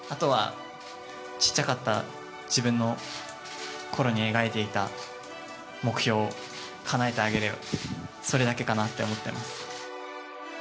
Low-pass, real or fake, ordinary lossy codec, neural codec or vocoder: none; real; none; none